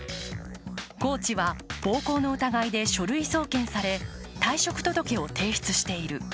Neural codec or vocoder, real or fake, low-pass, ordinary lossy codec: none; real; none; none